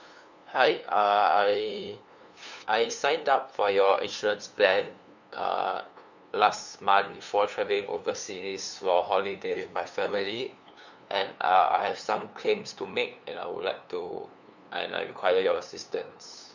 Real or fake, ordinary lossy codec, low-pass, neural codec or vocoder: fake; none; 7.2 kHz; codec, 16 kHz, 2 kbps, FunCodec, trained on LibriTTS, 25 frames a second